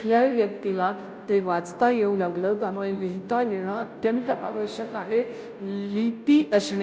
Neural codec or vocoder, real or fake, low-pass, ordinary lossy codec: codec, 16 kHz, 0.5 kbps, FunCodec, trained on Chinese and English, 25 frames a second; fake; none; none